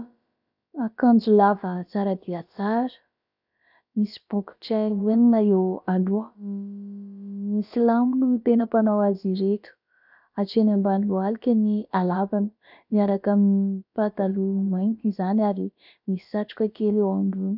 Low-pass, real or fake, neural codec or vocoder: 5.4 kHz; fake; codec, 16 kHz, about 1 kbps, DyCAST, with the encoder's durations